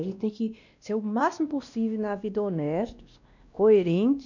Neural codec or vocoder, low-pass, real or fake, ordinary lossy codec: codec, 16 kHz, 1 kbps, X-Codec, WavLM features, trained on Multilingual LibriSpeech; 7.2 kHz; fake; none